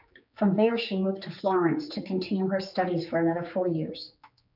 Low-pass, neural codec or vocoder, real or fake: 5.4 kHz; codec, 16 kHz, 4 kbps, X-Codec, HuBERT features, trained on general audio; fake